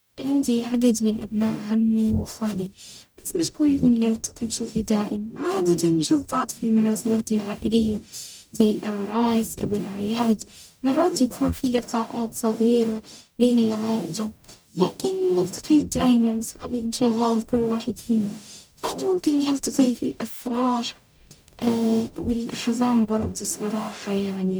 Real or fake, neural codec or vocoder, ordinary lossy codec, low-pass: fake; codec, 44.1 kHz, 0.9 kbps, DAC; none; none